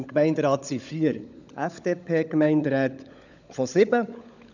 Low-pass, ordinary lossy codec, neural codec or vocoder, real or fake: 7.2 kHz; none; codec, 16 kHz, 16 kbps, FunCodec, trained on LibriTTS, 50 frames a second; fake